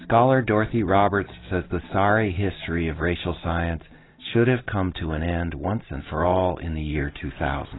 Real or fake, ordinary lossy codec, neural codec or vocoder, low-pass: real; AAC, 16 kbps; none; 7.2 kHz